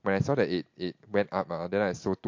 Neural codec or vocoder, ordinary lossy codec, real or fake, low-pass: none; MP3, 48 kbps; real; 7.2 kHz